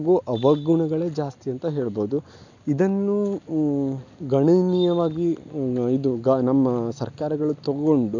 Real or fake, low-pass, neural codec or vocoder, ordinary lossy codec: real; 7.2 kHz; none; none